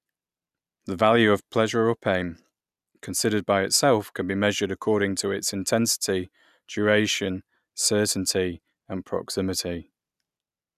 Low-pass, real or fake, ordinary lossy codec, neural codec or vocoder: 14.4 kHz; real; none; none